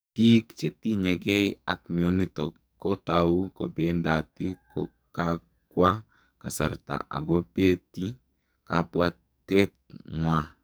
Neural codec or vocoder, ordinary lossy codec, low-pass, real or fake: codec, 44.1 kHz, 2.6 kbps, SNAC; none; none; fake